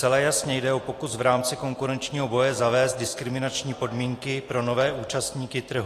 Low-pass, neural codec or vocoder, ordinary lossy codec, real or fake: 14.4 kHz; none; AAC, 48 kbps; real